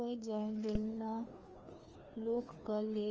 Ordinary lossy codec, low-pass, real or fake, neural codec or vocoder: Opus, 24 kbps; 7.2 kHz; fake; codec, 16 kHz, 2 kbps, FreqCodec, larger model